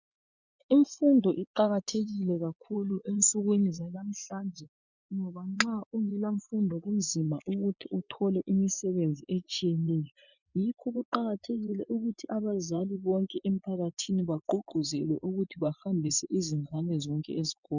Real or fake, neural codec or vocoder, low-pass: fake; vocoder, 24 kHz, 100 mel bands, Vocos; 7.2 kHz